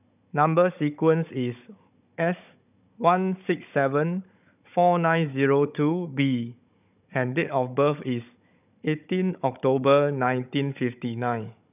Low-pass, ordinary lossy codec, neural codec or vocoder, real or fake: 3.6 kHz; none; codec, 16 kHz, 16 kbps, FunCodec, trained on Chinese and English, 50 frames a second; fake